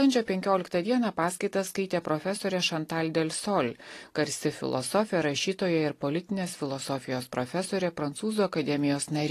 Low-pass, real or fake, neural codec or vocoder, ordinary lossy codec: 14.4 kHz; real; none; AAC, 48 kbps